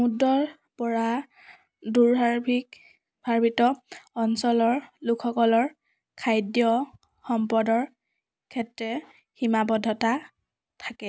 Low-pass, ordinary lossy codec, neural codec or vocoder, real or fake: none; none; none; real